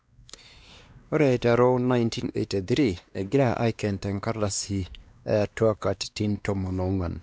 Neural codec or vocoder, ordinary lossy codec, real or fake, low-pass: codec, 16 kHz, 2 kbps, X-Codec, WavLM features, trained on Multilingual LibriSpeech; none; fake; none